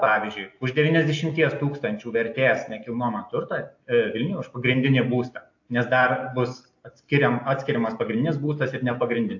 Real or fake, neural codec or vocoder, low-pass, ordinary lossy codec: real; none; 7.2 kHz; AAC, 48 kbps